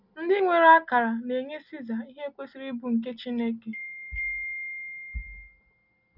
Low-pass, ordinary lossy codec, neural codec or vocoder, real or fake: 5.4 kHz; none; none; real